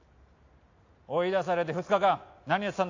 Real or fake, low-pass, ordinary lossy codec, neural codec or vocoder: real; 7.2 kHz; AAC, 48 kbps; none